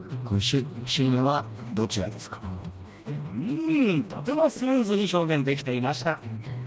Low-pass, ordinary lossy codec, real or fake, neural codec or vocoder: none; none; fake; codec, 16 kHz, 1 kbps, FreqCodec, smaller model